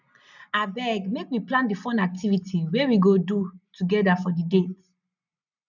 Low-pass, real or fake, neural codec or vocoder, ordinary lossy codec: 7.2 kHz; real; none; none